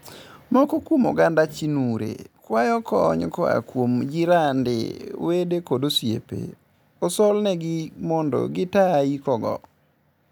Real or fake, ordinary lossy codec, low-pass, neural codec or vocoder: real; none; none; none